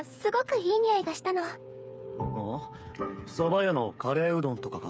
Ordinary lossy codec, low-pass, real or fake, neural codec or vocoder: none; none; fake; codec, 16 kHz, 8 kbps, FreqCodec, smaller model